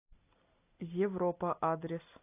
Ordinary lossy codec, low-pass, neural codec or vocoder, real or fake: AAC, 24 kbps; 3.6 kHz; none; real